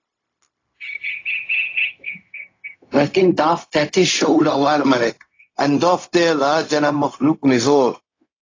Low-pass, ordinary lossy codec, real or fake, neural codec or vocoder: 7.2 kHz; AAC, 32 kbps; fake; codec, 16 kHz, 0.4 kbps, LongCat-Audio-Codec